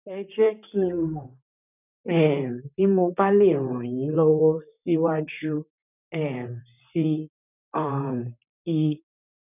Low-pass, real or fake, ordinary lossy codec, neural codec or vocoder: 3.6 kHz; fake; none; vocoder, 44.1 kHz, 128 mel bands, Pupu-Vocoder